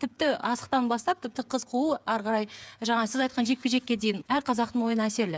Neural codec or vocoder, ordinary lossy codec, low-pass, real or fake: codec, 16 kHz, 8 kbps, FreqCodec, smaller model; none; none; fake